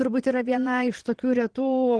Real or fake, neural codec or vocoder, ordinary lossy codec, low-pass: fake; vocoder, 22.05 kHz, 80 mel bands, Vocos; Opus, 16 kbps; 9.9 kHz